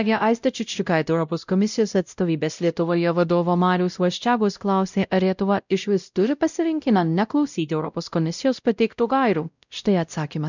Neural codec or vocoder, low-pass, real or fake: codec, 16 kHz, 0.5 kbps, X-Codec, WavLM features, trained on Multilingual LibriSpeech; 7.2 kHz; fake